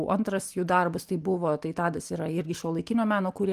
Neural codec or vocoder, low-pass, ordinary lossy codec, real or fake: none; 14.4 kHz; Opus, 32 kbps; real